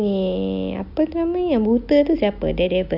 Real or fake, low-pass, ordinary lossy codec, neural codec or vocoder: real; 5.4 kHz; none; none